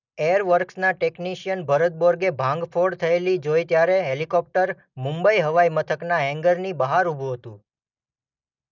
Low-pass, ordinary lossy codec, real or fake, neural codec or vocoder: 7.2 kHz; none; real; none